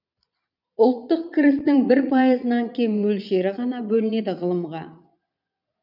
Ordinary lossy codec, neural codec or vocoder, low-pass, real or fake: none; vocoder, 22.05 kHz, 80 mel bands, Vocos; 5.4 kHz; fake